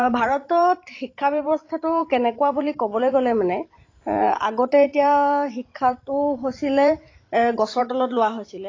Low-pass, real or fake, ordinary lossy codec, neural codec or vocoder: 7.2 kHz; fake; AAC, 32 kbps; vocoder, 44.1 kHz, 128 mel bands every 512 samples, BigVGAN v2